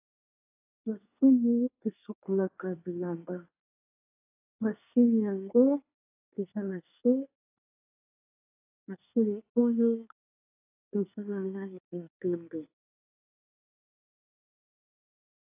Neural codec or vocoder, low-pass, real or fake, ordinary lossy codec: codec, 24 kHz, 1 kbps, SNAC; 3.6 kHz; fake; AAC, 32 kbps